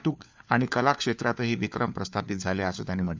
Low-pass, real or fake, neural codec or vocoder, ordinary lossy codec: none; fake; codec, 16 kHz, 4 kbps, FunCodec, trained on LibriTTS, 50 frames a second; none